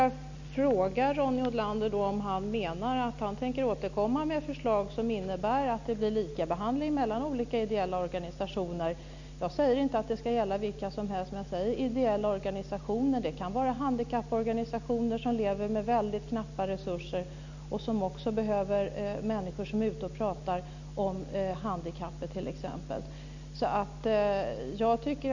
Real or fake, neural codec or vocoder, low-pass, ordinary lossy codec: real; none; 7.2 kHz; none